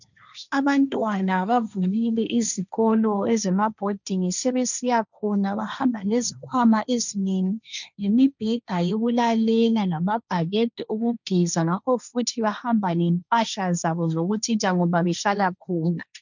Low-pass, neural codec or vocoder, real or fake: 7.2 kHz; codec, 16 kHz, 1.1 kbps, Voila-Tokenizer; fake